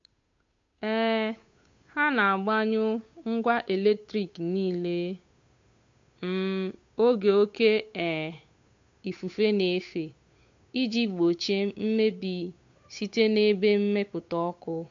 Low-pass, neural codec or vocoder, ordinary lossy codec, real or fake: 7.2 kHz; codec, 16 kHz, 8 kbps, FunCodec, trained on Chinese and English, 25 frames a second; MP3, 48 kbps; fake